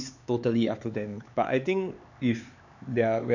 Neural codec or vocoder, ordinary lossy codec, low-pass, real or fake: codec, 16 kHz, 4 kbps, X-Codec, HuBERT features, trained on LibriSpeech; none; 7.2 kHz; fake